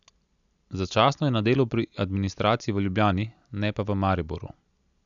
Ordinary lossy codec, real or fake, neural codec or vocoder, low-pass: none; real; none; 7.2 kHz